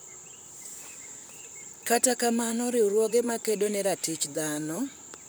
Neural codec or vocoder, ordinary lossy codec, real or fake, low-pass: vocoder, 44.1 kHz, 128 mel bands, Pupu-Vocoder; none; fake; none